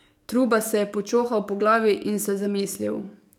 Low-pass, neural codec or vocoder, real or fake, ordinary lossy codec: 19.8 kHz; codec, 44.1 kHz, 7.8 kbps, DAC; fake; none